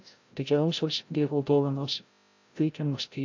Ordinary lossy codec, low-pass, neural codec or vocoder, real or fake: AAC, 48 kbps; 7.2 kHz; codec, 16 kHz, 0.5 kbps, FreqCodec, larger model; fake